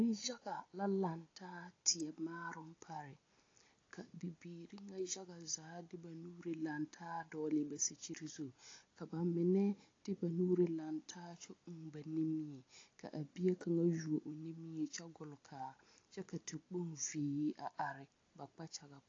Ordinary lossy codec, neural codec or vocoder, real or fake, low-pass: AAC, 32 kbps; none; real; 7.2 kHz